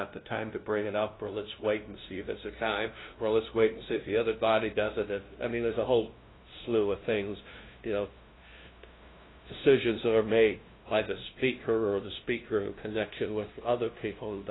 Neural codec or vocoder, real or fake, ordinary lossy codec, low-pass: codec, 16 kHz, 0.5 kbps, FunCodec, trained on LibriTTS, 25 frames a second; fake; AAC, 16 kbps; 7.2 kHz